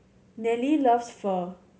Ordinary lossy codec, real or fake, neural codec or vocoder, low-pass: none; real; none; none